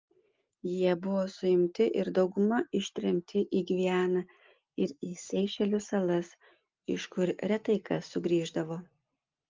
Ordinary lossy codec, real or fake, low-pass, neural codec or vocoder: Opus, 32 kbps; real; 7.2 kHz; none